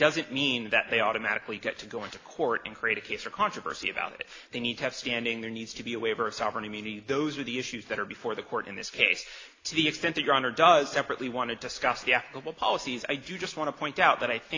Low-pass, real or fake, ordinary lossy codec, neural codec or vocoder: 7.2 kHz; real; AAC, 32 kbps; none